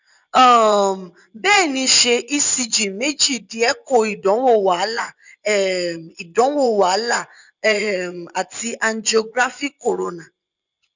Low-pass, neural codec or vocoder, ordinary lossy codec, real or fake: 7.2 kHz; vocoder, 24 kHz, 100 mel bands, Vocos; none; fake